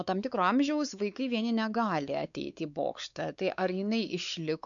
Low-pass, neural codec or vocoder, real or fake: 7.2 kHz; codec, 16 kHz, 4 kbps, X-Codec, WavLM features, trained on Multilingual LibriSpeech; fake